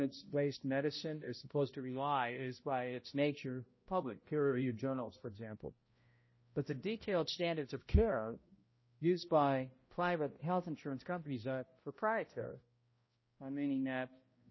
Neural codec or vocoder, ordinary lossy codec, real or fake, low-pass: codec, 16 kHz, 0.5 kbps, X-Codec, HuBERT features, trained on balanced general audio; MP3, 24 kbps; fake; 7.2 kHz